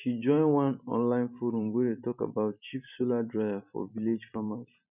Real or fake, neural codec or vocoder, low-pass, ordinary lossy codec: fake; vocoder, 44.1 kHz, 128 mel bands every 512 samples, BigVGAN v2; 3.6 kHz; none